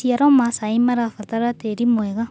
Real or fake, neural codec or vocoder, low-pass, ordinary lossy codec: real; none; none; none